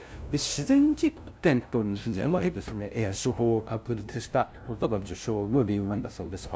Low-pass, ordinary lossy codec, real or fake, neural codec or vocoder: none; none; fake; codec, 16 kHz, 0.5 kbps, FunCodec, trained on LibriTTS, 25 frames a second